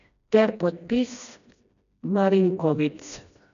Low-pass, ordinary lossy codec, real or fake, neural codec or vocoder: 7.2 kHz; none; fake; codec, 16 kHz, 1 kbps, FreqCodec, smaller model